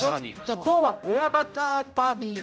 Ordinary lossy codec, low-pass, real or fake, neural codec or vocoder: none; none; fake; codec, 16 kHz, 0.5 kbps, X-Codec, HuBERT features, trained on general audio